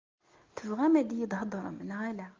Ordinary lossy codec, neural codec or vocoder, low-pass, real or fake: Opus, 24 kbps; none; 7.2 kHz; real